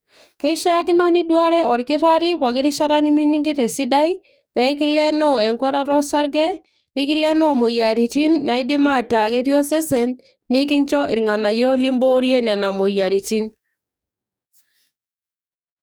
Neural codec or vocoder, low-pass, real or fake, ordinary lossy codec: codec, 44.1 kHz, 2.6 kbps, DAC; none; fake; none